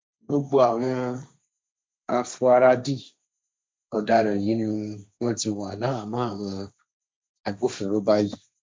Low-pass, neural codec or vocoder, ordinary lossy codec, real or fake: none; codec, 16 kHz, 1.1 kbps, Voila-Tokenizer; none; fake